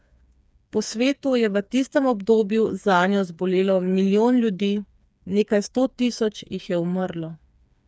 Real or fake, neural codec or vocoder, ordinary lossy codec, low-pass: fake; codec, 16 kHz, 4 kbps, FreqCodec, smaller model; none; none